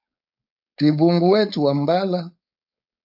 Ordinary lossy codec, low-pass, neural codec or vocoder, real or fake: AAC, 48 kbps; 5.4 kHz; codec, 16 kHz, 4.8 kbps, FACodec; fake